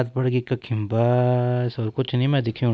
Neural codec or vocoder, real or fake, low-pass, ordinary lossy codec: none; real; none; none